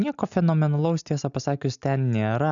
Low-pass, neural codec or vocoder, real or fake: 7.2 kHz; none; real